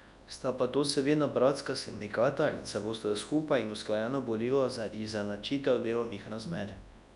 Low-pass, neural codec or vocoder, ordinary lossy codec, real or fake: 10.8 kHz; codec, 24 kHz, 0.9 kbps, WavTokenizer, large speech release; none; fake